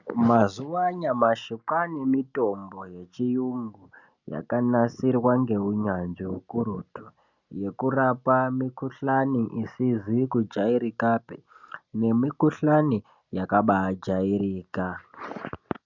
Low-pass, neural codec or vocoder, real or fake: 7.2 kHz; none; real